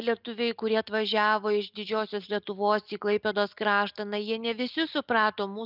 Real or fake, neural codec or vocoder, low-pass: real; none; 5.4 kHz